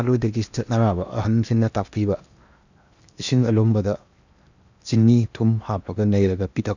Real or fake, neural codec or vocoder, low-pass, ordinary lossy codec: fake; codec, 16 kHz in and 24 kHz out, 0.8 kbps, FocalCodec, streaming, 65536 codes; 7.2 kHz; none